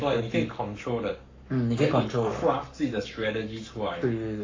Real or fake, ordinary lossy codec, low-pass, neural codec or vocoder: fake; AAC, 32 kbps; 7.2 kHz; codec, 44.1 kHz, 7.8 kbps, Pupu-Codec